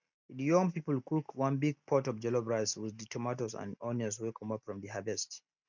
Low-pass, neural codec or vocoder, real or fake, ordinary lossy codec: 7.2 kHz; none; real; none